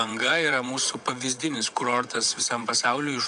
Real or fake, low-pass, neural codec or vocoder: fake; 9.9 kHz; vocoder, 22.05 kHz, 80 mel bands, WaveNeXt